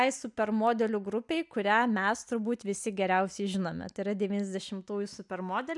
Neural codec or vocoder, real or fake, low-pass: none; real; 10.8 kHz